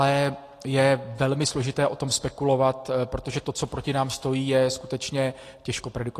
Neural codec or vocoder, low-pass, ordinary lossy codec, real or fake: none; 14.4 kHz; AAC, 48 kbps; real